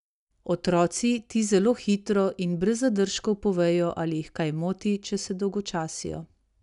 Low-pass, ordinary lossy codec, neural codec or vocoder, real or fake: 10.8 kHz; none; none; real